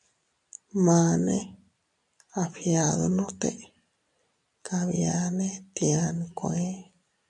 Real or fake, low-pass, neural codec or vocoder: real; 10.8 kHz; none